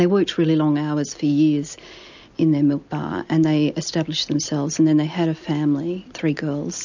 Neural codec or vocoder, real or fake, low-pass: none; real; 7.2 kHz